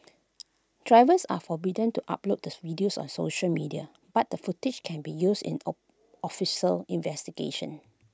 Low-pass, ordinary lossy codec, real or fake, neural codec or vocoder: none; none; real; none